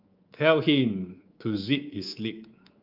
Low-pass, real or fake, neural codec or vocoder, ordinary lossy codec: 5.4 kHz; fake; codec, 24 kHz, 3.1 kbps, DualCodec; Opus, 24 kbps